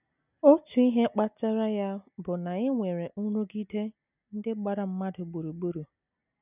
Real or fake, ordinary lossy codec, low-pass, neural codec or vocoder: real; none; 3.6 kHz; none